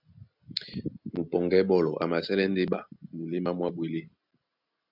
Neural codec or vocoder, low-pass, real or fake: none; 5.4 kHz; real